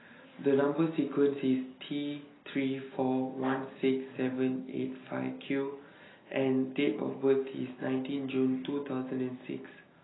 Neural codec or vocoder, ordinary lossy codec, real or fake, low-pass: none; AAC, 16 kbps; real; 7.2 kHz